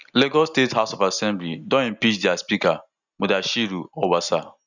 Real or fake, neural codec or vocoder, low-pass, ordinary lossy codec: real; none; 7.2 kHz; none